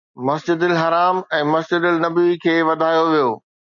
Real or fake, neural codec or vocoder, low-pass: real; none; 7.2 kHz